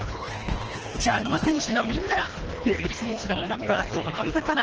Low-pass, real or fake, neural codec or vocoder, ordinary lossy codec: 7.2 kHz; fake; codec, 24 kHz, 1.5 kbps, HILCodec; Opus, 16 kbps